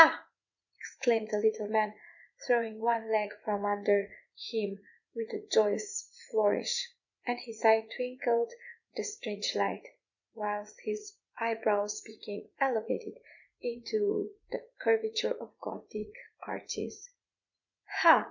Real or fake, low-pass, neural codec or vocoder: fake; 7.2 kHz; vocoder, 44.1 kHz, 80 mel bands, Vocos